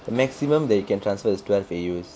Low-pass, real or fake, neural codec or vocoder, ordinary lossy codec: none; real; none; none